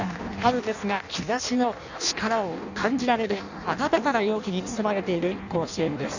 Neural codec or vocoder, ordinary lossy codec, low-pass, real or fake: codec, 16 kHz in and 24 kHz out, 0.6 kbps, FireRedTTS-2 codec; none; 7.2 kHz; fake